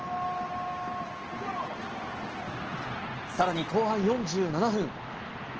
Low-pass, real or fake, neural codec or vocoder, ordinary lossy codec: 7.2 kHz; real; none; Opus, 16 kbps